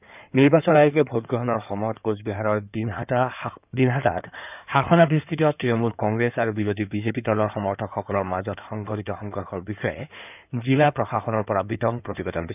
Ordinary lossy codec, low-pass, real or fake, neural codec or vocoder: none; 3.6 kHz; fake; codec, 16 kHz in and 24 kHz out, 2.2 kbps, FireRedTTS-2 codec